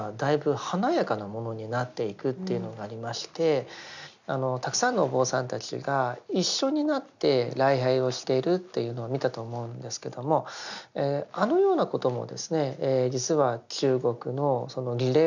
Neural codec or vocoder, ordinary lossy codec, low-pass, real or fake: none; none; 7.2 kHz; real